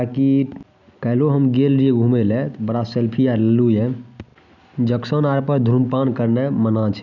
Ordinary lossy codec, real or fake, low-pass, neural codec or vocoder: none; real; 7.2 kHz; none